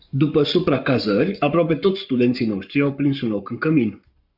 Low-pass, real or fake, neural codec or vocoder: 5.4 kHz; fake; codec, 44.1 kHz, 7.8 kbps, Pupu-Codec